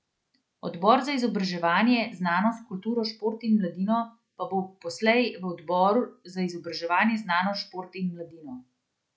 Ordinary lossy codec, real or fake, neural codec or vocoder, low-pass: none; real; none; none